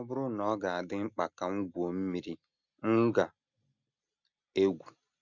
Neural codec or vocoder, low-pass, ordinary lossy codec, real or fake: none; 7.2 kHz; none; real